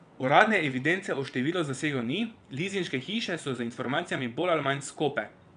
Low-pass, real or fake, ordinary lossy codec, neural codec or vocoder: 9.9 kHz; fake; none; vocoder, 22.05 kHz, 80 mel bands, WaveNeXt